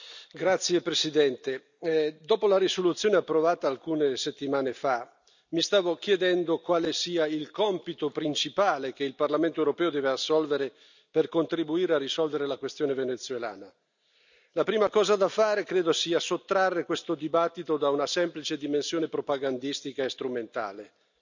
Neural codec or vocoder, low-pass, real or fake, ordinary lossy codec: none; 7.2 kHz; real; none